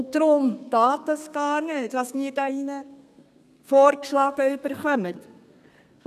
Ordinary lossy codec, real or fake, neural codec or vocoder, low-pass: none; fake; codec, 32 kHz, 1.9 kbps, SNAC; 14.4 kHz